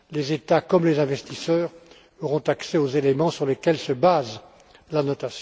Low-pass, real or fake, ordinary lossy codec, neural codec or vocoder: none; real; none; none